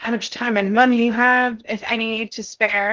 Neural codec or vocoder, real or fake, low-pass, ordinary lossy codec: codec, 16 kHz in and 24 kHz out, 0.6 kbps, FocalCodec, streaming, 2048 codes; fake; 7.2 kHz; Opus, 32 kbps